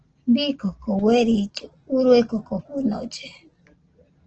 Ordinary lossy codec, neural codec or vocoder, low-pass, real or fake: Opus, 16 kbps; none; 7.2 kHz; real